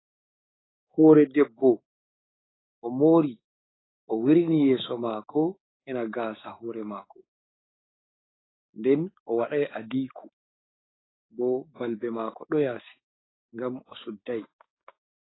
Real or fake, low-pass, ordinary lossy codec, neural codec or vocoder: fake; 7.2 kHz; AAC, 16 kbps; codec, 16 kHz, 6 kbps, DAC